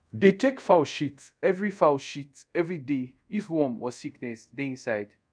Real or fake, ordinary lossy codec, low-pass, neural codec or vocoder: fake; none; 9.9 kHz; codec, 24 kHz, 0.5 kbps, DualCodec